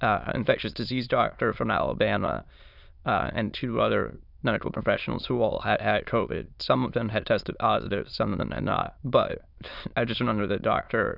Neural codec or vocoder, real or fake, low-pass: autoencoder, 22.05 kHz, a latent of 192 numbers a frame, VITS, trained on many speakers; fake; 5.4 kHz